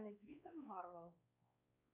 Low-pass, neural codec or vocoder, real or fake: 3.6 kHz; codec, 16 kHz, 2 kbps, X-Codec, WavLM features, trained on Multilingual LibriSpeech; fake